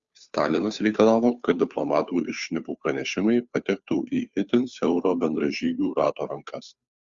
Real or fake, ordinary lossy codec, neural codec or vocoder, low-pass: fake; Opus, 64 kbps; codec, 16 kHz, 2 kbps, FunCodec, trained on Chinese and English, 25 frames a second; 7.2 kHz